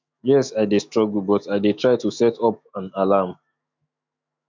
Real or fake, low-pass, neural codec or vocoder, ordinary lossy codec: fake; 7.2 kHz; autoencoder, 48 kHz, 128 numbers a frame, DAC-VAE, trained on Japanese speech; MP3, 64 kbps